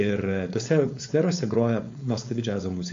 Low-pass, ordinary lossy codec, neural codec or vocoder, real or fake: 7.2 kHz; AAC, 48 kbps; codec, 16 kHz, 4.8 kbps, FACodec; fake